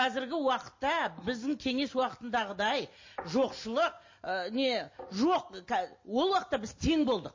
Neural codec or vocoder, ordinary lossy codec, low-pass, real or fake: none; MP3, 32 kbps; 7.2 kHz; real